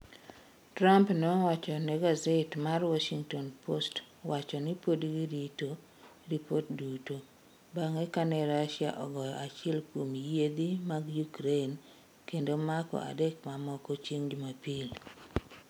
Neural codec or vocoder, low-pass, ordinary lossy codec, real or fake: none; none; none; real